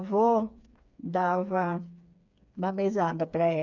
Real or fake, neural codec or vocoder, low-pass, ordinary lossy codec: fake; codec, 16 kHz, 4 kbps, FreqCodec, smaller model; 7.2 kHz; none